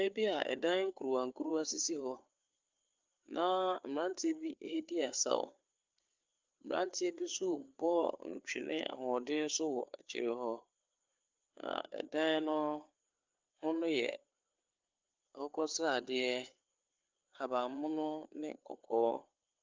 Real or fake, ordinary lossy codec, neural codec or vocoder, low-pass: fake; Opus, 32 kbps; codec, 16 kHz, 4 kbps, FreqCodec, larger model; 7.2 kHz